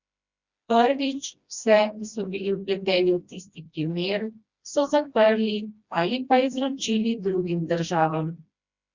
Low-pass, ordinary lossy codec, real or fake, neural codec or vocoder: 7.2 kHz; Opus, 64 kbps; fake; codec, 16 kHz, 1 kbps, FreqCodec, smaller model